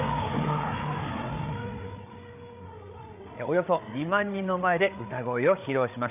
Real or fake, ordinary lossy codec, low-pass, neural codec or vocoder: fake; none; 3.6 kHz; codec, 16 kHz, 8 kbps, FreqCodec, larger model